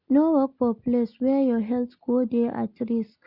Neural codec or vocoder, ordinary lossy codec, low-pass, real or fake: none; none; 5.4 kHz; real